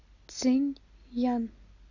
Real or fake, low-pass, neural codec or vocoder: real; 7.2 kHz; none